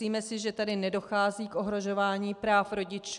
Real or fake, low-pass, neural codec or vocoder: real; 10.8 kHz; none